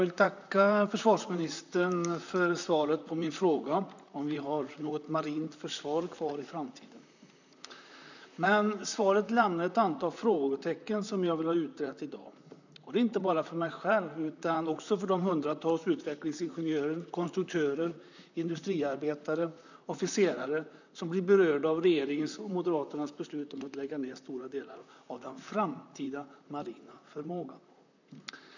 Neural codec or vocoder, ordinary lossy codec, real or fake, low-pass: vocoder, 44.1 kHz, 128 mel bands, Pupu-Vocoder; none; fake; 7.2 kHz